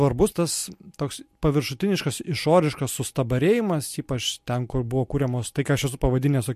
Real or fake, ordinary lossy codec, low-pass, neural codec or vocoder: real; MP3, 64 kbps; 14.4 kHz; none